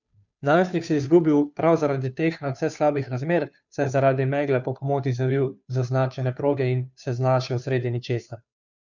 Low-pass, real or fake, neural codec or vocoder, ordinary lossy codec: 7.2 kHz; fake; codec, 16 kHz, 2 kbps, FunCodec, trained on Chinese and English, 25 frames a second; none